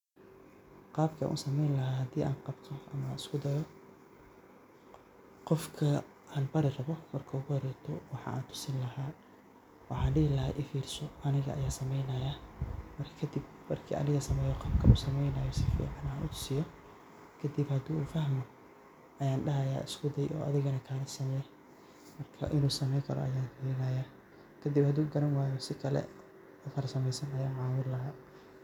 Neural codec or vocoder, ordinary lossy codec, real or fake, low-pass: none; none; real; 19.8 kHz